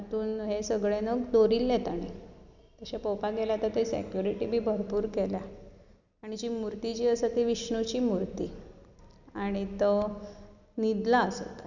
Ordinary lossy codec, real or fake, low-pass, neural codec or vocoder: none; real; 7.2 kHz; none